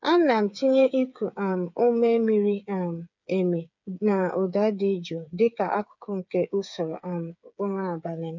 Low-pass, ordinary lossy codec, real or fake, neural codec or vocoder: 7.2 kHz; none; fake; codec, 16 kHz, 8 kbps, FreqCodec, smaller model